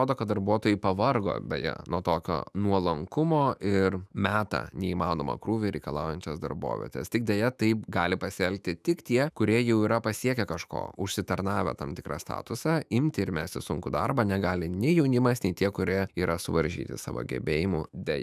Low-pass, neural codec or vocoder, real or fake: 14.4 kHz; autoencoder, 48 kHz, 128 numbers a frame, DAC-VAE, trained on Japanese speech; fake